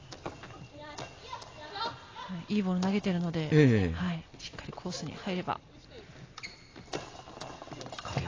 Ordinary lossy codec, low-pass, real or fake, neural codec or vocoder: AAC, 32 kbps; 7.2 kHz; real; none